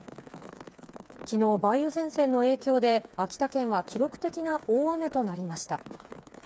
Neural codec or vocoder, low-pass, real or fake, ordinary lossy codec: codec, 16 kHz, 4 kbps, FreqCodec, smaller model; none; fake; none